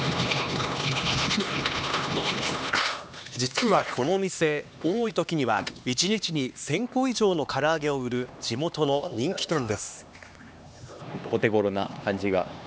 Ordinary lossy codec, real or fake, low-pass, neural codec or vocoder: none; fake; none; codec, 16 kHz, 2 kbps, X-Codec, HuBERT features, trained on LibriSpeech